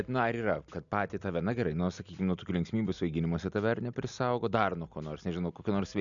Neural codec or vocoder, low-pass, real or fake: none; 7.2 kHz; real